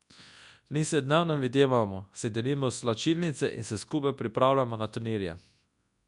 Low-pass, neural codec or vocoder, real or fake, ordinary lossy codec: 10.8 kHz; codec, 24 kHz, 0.9 kbps, WavTokenizer, large speech release; fake; none